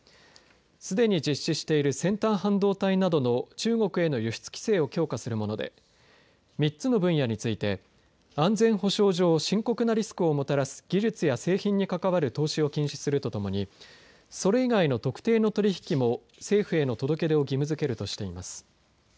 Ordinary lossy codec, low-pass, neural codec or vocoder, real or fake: none; none; none; real